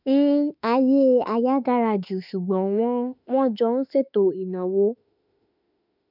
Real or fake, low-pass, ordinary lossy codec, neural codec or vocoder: fake; 5.4 kHz; none; autoencoder, 48 kHz, 32 numbers a frame, DAC-VAE, trained on Japanese speech